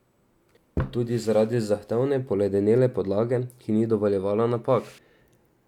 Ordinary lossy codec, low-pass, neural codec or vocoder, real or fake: none; 19.8 kHz; none; real